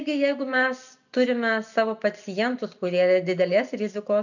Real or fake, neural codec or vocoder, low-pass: real; none; 7.2 kHz